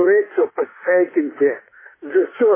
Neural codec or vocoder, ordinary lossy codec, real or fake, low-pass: autoencoder, 48 kHz, 32 numbers a frame, DAC-VAE, trained on Japanese speech; MP3, 16 kbps; fake; 3.6 kHz